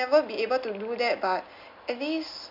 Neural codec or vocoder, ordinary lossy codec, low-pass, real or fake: none; none; 5.4 kHz; real